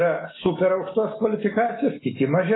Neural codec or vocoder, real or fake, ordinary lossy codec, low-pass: none; real; AAC, 16 kbps; 7.2 kHz